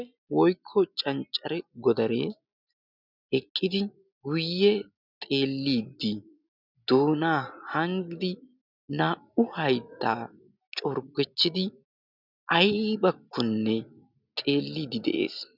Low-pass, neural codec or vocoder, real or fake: 5.4 kHz; none; real